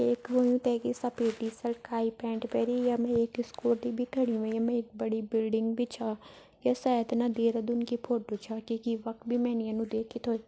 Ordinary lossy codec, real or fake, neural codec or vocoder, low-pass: none; real; none; none